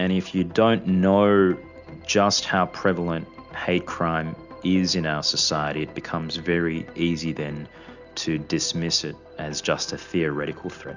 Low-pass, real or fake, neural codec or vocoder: 7.2 kHz; real; none